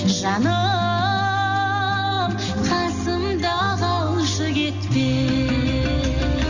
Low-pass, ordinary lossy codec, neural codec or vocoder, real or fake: 7.2 kHz; none; none; real